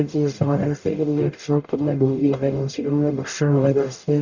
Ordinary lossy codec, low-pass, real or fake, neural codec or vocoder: Opus, 64 kbps; 7.2 kHz; fake; codec, 44.1 kHz, 0.9 kbps, DAC